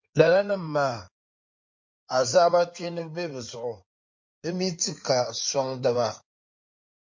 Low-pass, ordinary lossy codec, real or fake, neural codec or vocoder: 7.2 kHz; MP3, 48 kbps; fake; codec, 16 kHz in and 24 kHz out, 2.2 kbps, FireRedTTS-2 codec